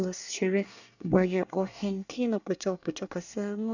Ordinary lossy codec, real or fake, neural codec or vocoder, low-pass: none; fake; codec, 24 kHz, 1 kbps, SNAC; 7.2 kHz